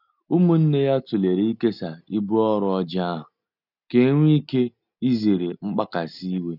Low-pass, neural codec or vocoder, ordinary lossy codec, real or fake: 5.4 kHz; none; none; real